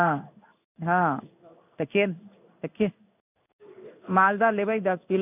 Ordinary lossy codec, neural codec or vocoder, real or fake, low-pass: none; codec, 16 kHz in and 24 kHz out, 1 kbps, XY-Tokenizer; fake; 3.6 kHz